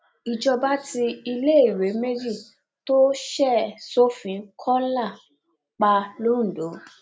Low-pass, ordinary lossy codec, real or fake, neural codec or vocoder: none; none; real; none